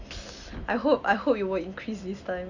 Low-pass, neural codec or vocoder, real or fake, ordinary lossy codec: 7.2 kHz; none; real; AAC, 48 kbps